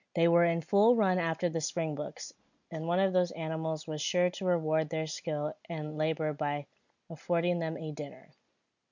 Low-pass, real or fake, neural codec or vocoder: 7.2 kHz; real; none